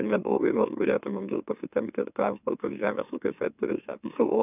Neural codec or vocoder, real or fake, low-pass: autoencoder, 44.1 kHz, a latent of 192 numbers a frame, MeloTTS; fake; 3.6 kHz